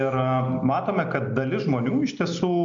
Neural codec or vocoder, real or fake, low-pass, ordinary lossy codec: none; real; 7.2 kHz; MP3, 64 kbps